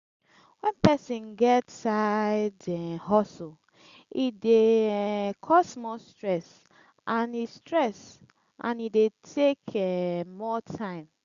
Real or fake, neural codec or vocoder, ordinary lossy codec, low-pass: real; none; Opus, 64 kbps; 7.2 kHz